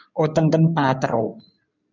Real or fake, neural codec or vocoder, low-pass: fake; codec, 16 kHz in and 24 kHz out, 2.2 kbps, FireRedTTS-2 codec; 7.2 kHz